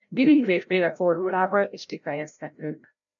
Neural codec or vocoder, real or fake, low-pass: codec, 16 kHz, 0.5 kbps, FreqCodec, larger model; fake; 7.2 kHz